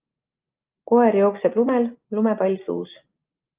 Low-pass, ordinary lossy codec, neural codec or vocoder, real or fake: 3.6 kHz; Opus, 32 kbps; none; real